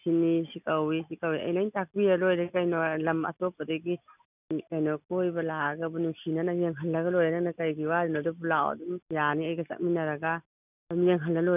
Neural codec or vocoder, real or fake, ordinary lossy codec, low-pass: none; real; none; 3.6 kHz